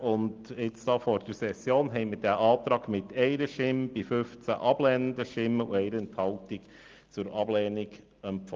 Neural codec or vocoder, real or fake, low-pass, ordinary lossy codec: none; real; 7.2 kHz; Opus, 32 kbps